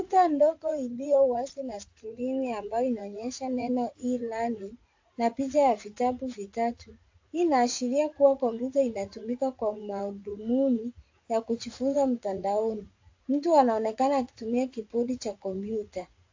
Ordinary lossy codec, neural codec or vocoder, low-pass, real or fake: AAC, 48 kbps; vocoder, 44.1 kHz, 80 mel bands, Vocos; 7.2 kHz; fake